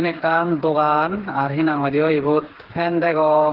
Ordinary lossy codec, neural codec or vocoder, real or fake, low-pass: Opus, 16 kbps; codec, 16 kHz, 4 kbps, FreqCodec, smaller model; fake; 5.4 kHz